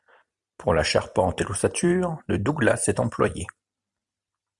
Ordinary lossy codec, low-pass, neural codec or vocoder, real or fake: MP3, 96 kbps; 10.8 kHz; vocoder, 44.1 kHz, 128 mel bands every 256 samples, BigVGAN v2; fake